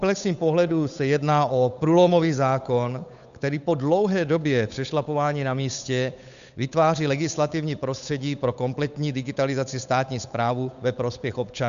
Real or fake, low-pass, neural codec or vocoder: fake; 7.2 kHz; codec, 16 kHz, 8 kbps, FunCodec, trained on Chinese and English, 25 frames a second